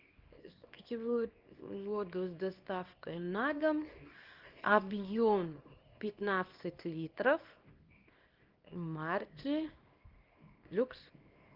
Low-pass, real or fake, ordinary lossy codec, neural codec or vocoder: 5.4 kHz; fake; Opus, 24 kbps; codec, 24 kHz, 0.9 kbps, WavTokenizer, small release